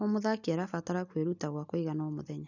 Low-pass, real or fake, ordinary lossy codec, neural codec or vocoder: 7.2 kHz; real; none; none